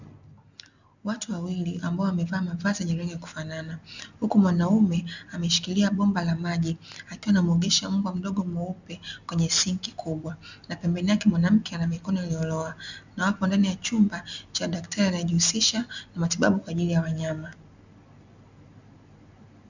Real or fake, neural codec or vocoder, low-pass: real; none; 7.2 kHz